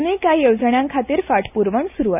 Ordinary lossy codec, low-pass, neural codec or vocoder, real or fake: none; 3.6 kHz; none; real